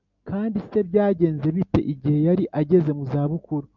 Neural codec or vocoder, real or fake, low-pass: none; real; 7.2 kHz